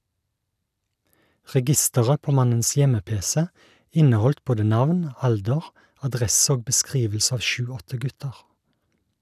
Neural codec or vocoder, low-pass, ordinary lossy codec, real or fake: none; 14.4 kHz; none; real